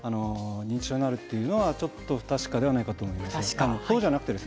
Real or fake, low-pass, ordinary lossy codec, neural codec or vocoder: real; none; none; none